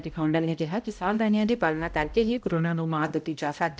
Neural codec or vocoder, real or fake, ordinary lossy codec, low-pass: codec, 16 kHz, 0.5 kbps, X-Codec, HuBERT features, trained on balanced general audio; fake; none; none